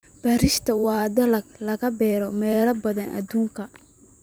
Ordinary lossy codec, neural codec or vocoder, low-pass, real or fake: none; vocoder, 44.1 kHz, 128 mel bands every 512 samples, BigVGAN v2; none; fake